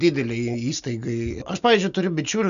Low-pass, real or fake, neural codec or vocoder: 7.2 kHz; real; none